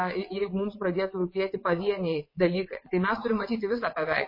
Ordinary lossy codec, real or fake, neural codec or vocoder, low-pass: MP3, 24 kbps; fake; vocoder, 22.05 kHz, 80 mel bands, WaveNeXt; 5.4 kHz